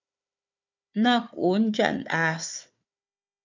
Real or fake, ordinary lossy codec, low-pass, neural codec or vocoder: fake; MP3, 64 kbps; 7.2 kHz; codec, 16 kHz, 4 kbps, FunCodec, trained on Chinese and English, 50 frames a second